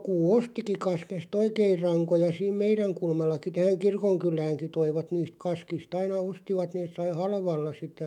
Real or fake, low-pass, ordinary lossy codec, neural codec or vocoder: fake; 19.8 kHz; MP3, 96 kbps; autoencoder, 48 kHz, 128 numbers a frame, DAC-VAE, trained on Japanese speech